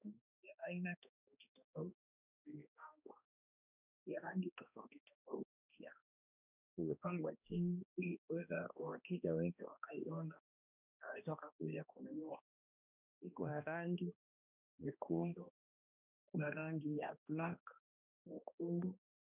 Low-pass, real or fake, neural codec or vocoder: 3.6 kHz; fake; codec, 16 kHz, 1 kbps, X-Codec, HuBERT features, trained on balanced general audio